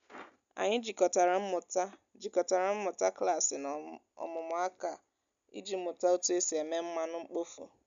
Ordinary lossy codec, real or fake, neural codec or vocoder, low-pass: none; real; none; 7.2 kHz